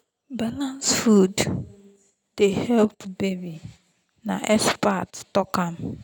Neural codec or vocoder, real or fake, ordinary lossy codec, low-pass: none; real; none; none